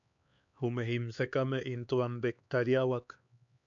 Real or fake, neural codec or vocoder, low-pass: fake; codec, 16 kHz, 4 kbps, X-Codec, HuBERT features, trained on LibriSpeech; 7.2 kHz